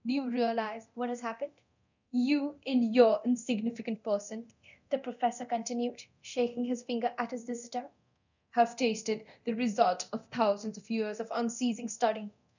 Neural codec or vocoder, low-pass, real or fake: codec, 24 kHz, 0.9 kbps, DualCodec; 7.2 kHz; fake